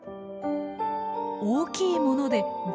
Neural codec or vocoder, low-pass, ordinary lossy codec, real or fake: none; none; none; real